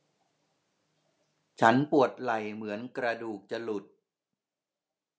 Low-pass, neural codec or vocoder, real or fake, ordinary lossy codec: none; none; real; none